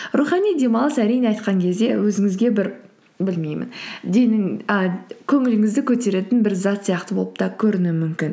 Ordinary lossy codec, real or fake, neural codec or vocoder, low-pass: none; real; none; none